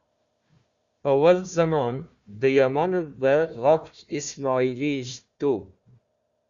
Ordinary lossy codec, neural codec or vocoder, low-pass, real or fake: Opus, 64 kbps; codec, 16 kHz, 1 kbps, FunCodec, trained on Chinese and English, 50 frames a second; 7.2 kHz; fake